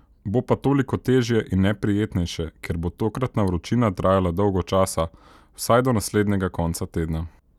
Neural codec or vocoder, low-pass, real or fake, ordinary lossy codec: none; 19.8 kHz; real; none